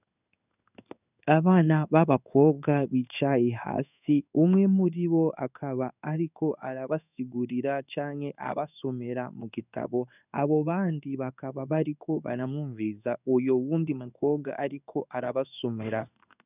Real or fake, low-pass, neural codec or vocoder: fake; 3.6 kHz; codec, 16 kHz in and 24 kHz out, 1 kbps, XY-Tokenizer